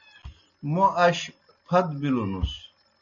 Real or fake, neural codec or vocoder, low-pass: real; none; 7.2 kHz